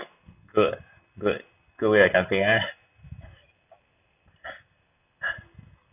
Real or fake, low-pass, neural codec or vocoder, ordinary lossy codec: real; 3.6 kHz; none; none